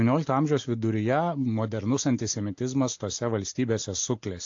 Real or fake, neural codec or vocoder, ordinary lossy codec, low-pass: real; none; AAC, 48 kbps; 7.2 kHz